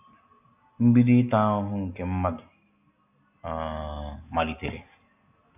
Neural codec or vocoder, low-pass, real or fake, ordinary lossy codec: none; 3.6 kHz; real; AAC, 32 kbps